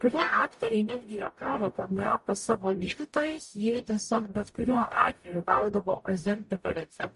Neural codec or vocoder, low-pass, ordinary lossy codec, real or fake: codec, 44.1 kHz, 0.9 kbps, DAC; 14.4 kHz; MP3, 48 kbps; fake